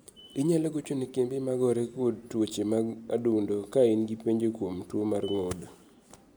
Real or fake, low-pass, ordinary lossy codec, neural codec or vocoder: real; none; none; none